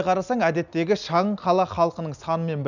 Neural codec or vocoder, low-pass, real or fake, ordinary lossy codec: none; 7.2 kHz; real; none